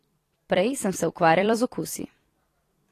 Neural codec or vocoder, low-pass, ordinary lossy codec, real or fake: vocoder, 44.1 kHz, 128 mel bands every 256 samples, BigVGAN v2; 14.4 kHz; AAC, 48 kbps; fake